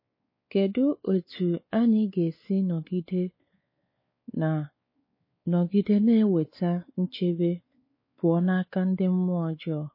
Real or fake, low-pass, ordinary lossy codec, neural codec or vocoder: fake; 5.4 kHz; MP3, 24 kbps; codec, 16 kHz, 4 kbps, X-Codec, WavLM features, trained on Multilingual LibriSpeech